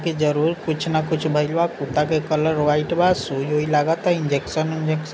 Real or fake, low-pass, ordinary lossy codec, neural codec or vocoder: real; none; none; none